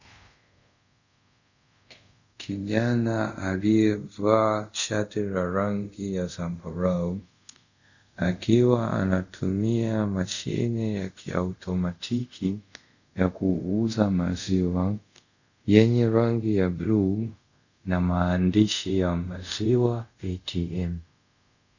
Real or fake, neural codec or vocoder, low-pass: fake; codec, 24 kHz, 0.5 kbps, DualCodec; 7.2 kHz